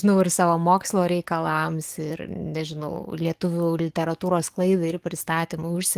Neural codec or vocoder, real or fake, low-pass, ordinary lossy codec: codec, 44.1 kHz, 7.8 kbps, DAC; fake; 14.4 kHz; Opus, 24 kbps